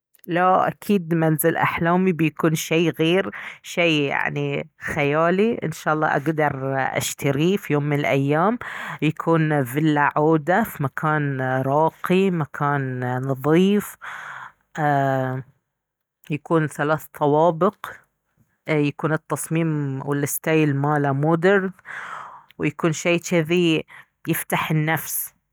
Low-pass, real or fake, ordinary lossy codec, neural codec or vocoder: none; real; none; none